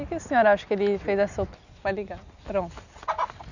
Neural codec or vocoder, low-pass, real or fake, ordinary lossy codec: none; 7.2 kHz; real; none